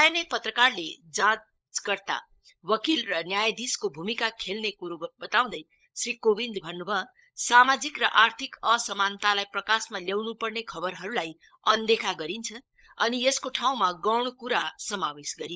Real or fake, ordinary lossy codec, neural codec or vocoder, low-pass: fake; none; codec, 16 kHz, 16 kbps, FunCodec, trained on LibriTTS, 50 frames a second; none